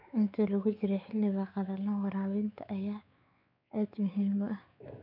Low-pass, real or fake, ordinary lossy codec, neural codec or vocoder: 5.4 kHz; fake; none; codec, 16 kHz, 4 kbps, X-Codec, WavLM features, trained on Multilingual LibriSpeech